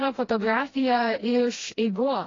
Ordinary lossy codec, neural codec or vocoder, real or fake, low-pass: AAC, 32 kbps; codec, 16 kHz, 1 kbps, FreqCodec, smaller model; fake; 7.2 kHz